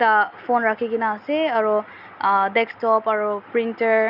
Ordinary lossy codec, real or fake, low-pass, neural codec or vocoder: none; real; 5.4 kHz; none